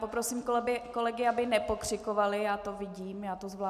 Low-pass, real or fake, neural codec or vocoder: 14.4 kHz; real; none